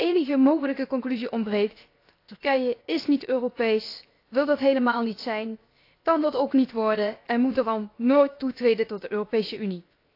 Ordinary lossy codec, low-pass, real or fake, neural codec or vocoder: AAC, 32 kbps; 5.4 kHz; fake; codec, 16 kHz, 0.7 kbps, FocalCodec